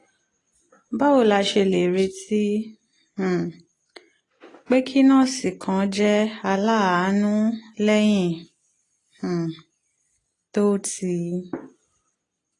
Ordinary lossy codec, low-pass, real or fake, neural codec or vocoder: AAC, 32 kbps; 10.8 kHz; real; none